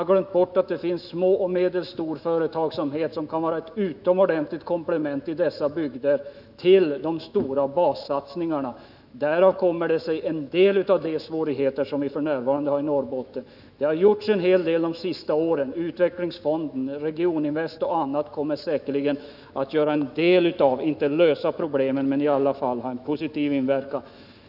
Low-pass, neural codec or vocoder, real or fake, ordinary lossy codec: 5.4 kHz; autoencoder, 48 kHz, 128 numbers a frame, DAC-VAE, trained on Japanese speech; fake; none